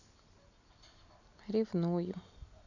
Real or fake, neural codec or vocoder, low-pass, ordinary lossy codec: real; none; 7.2 kHz; none